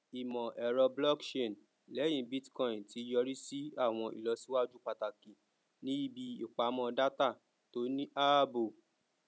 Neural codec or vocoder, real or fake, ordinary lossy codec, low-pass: none; real; none; none